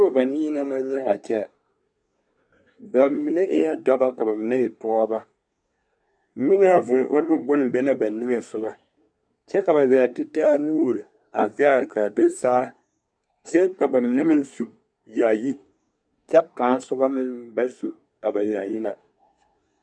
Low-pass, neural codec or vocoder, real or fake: 9.9 kHz; codec, 24 kHz, 1 kbps, SNAC; fake